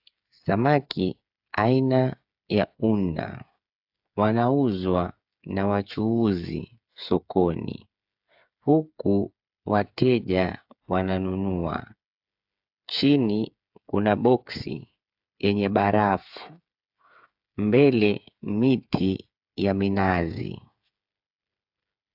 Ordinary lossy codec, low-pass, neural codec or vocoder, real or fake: AAC, 48 kbps; 5.4 kHz; codec, 16 kHz, 8 kbps, FreqCodec, smaller model; fake